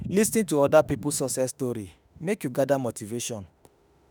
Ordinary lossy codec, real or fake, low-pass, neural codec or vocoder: none; fake; none; autoencoder, 48 kHz, 32 numbers a frame, DAC-VAE, trained on Japanese speech